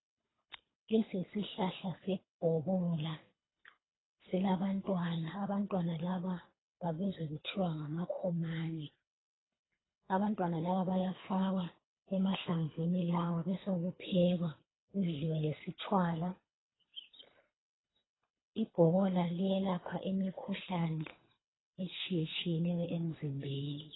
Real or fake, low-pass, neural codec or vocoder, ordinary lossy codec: fake; 7.2 kHz; codec, 24 kHz, 3 kbps, HILCodec; AAC, 16 kbps